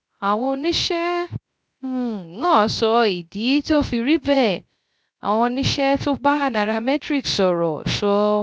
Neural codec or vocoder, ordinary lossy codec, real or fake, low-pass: codec, 16 kHz, 0.3 kbps, FocalCodec; none; fake; none